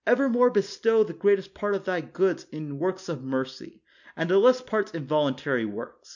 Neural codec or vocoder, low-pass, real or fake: none; 7.2 kHz; real